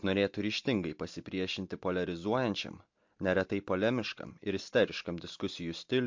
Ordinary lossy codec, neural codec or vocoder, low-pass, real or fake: MP3, 48 kbps; none; 7.2 kHz; real